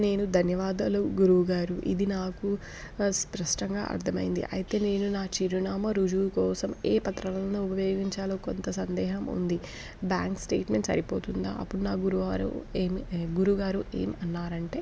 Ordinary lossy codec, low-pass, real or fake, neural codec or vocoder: none; none; real; none